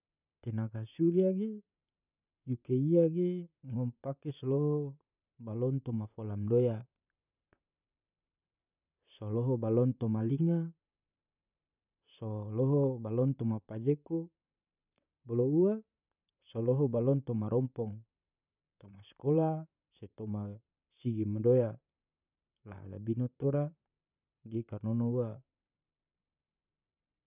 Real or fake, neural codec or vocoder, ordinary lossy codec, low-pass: fake; vocoder, 44.1 kHz, 128 mel bands, Pupu-Vocoder; none; 3.6 kHz